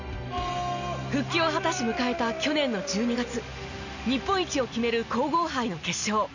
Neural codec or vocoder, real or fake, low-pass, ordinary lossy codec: none; real; 7.2 kHz; none